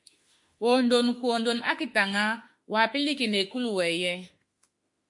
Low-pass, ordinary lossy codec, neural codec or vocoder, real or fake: 10.8 kHz; MP3, 48 kbps; autoencoder, 48 kHz, 32 numbers a frame, DAC-VAE, trained on Japanese speech; fake